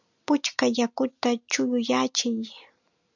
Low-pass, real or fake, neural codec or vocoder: 7.2 kHz; real; none